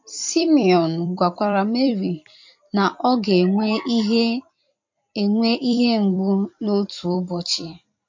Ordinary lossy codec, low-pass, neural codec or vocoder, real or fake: MP3, 48 kbps; 7.2 kHz; vocoder, 44.1 kHz, 128 mel bands every 256 samples, BigVGAN v2; fake